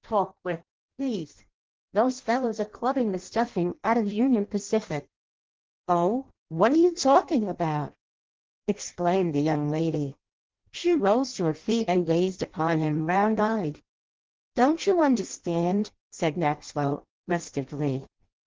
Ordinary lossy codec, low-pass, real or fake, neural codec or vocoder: Opus, 16 kbps; 7.2 kHz; fake; codec, 16 kHz in and 24 kHz out, 0.6 kbps, FireRedTTS-2 codec